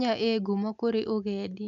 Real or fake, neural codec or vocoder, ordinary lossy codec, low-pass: real; none; none; 7.2 kHz